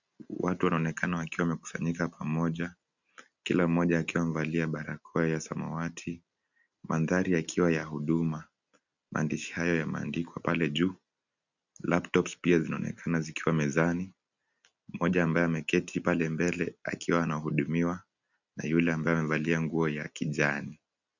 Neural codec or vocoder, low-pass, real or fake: none; 7.2 kHz; real